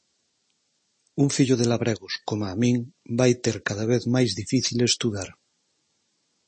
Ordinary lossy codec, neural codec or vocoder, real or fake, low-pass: MP3, 32 kbps; none; real; 10.8 kHz